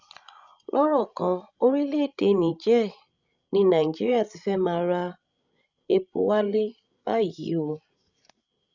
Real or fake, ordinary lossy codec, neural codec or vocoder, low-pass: fake; none; codec, 16 kHz, 16 kbps, FreqCodec, larger model; 7.2 kHz